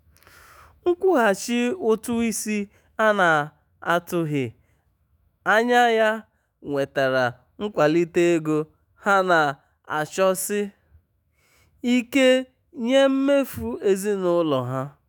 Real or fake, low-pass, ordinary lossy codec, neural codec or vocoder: fake; none; none; autoencoder, 48 kHz, 128 numbers a frame, DAC-VAE, trained on Japanese speech